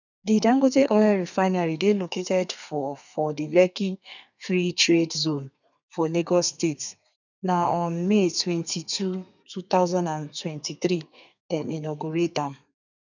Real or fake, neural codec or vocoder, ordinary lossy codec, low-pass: fake; codec, 32 kHz, 1.9 kbps, SNAC; none; 7.2 kHz